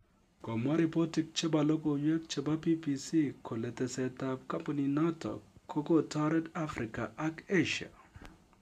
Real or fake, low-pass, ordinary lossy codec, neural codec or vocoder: real; 14.4 kHz; none; none